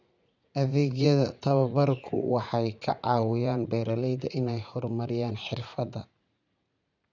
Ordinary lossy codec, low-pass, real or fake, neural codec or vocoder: none; 7.2 kHz; fake; vocoder, 44.1 kHz, 80 mel bands, Vocos